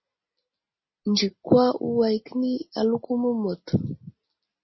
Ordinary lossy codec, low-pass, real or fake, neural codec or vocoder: MP3, 24 kbps; 7.2 kHz; real; none